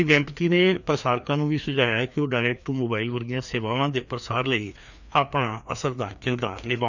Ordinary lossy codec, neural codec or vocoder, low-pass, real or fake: none; codec, 16 kHz, 2 kbps, FreqCodec, larger model; 7.2 kHz; fake